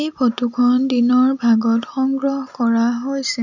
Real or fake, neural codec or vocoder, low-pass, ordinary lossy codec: real; none; 7.2 kHz; none